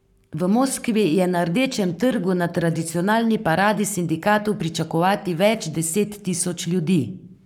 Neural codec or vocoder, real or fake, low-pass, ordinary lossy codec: codec, 44.1 kHz, 7.8 kbps, Pupu-Codec; fake; 19.8 kHz; none